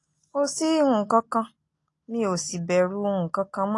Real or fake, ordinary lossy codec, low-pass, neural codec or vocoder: real; AAC, 48 kbps; 10.8 kHz; none